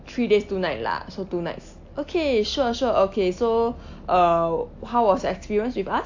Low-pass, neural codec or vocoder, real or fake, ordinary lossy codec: 7.2 kHz; none; real; AAC, 48 kbps